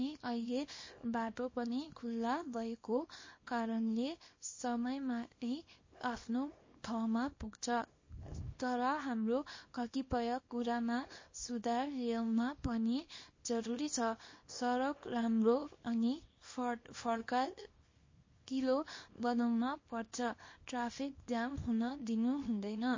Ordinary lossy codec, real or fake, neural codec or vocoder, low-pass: MP3, 32 kbps; fake; codec, 24 kHz, 0.9 kbps, WavTokenizer, small release; 7.2 kHz